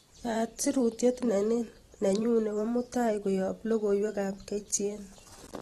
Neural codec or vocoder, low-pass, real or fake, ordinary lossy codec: vocoder, 44.1 kHz, 128 mel bands every 512 samples, BigVGAN v2; 19.8 kHz; fake; AAC, 32 kbps